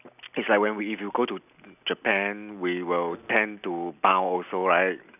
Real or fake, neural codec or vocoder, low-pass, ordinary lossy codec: real; none; 3.6 kHz; none